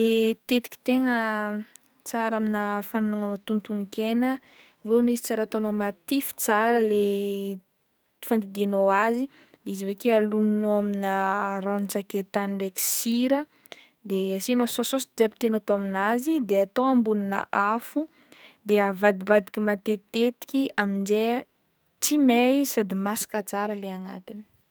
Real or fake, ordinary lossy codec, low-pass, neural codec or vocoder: fake; none; none; codec, 44.1 kHz, 2.6 kbps, SNAC